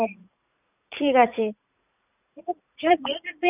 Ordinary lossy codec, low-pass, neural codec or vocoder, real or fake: none; 3.6 kHz; vocoder, 44.1 kHz, 80 mel bands, Vocos; fake